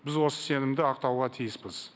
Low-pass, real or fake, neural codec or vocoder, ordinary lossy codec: none; real; none; none